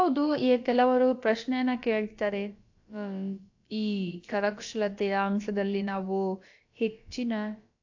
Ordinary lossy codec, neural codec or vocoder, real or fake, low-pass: AAC, 48 kbps; codec, 16 kHz, about 1 kbps, DyCAST, with the encoder's durations; fake; 7.2 kHz